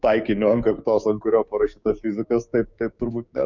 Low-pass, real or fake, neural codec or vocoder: 7.2 kHz; fake; codec, 16 kHz, 6 kbps, DAC